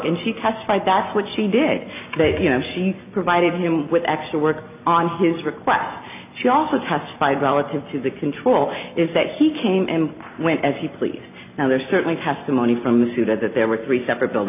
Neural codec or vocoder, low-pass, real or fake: none; 3.6 kHz; real